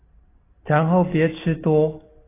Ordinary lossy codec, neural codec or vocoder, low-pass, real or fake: AAC, 16 kbps; none; 3.6 kHz; real